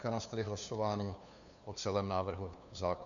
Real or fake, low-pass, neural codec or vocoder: fake; 7.2 kHz; codec, 16 kHz, 2 kbps, FunCodec, trained on LibriTTS, 25 frames a second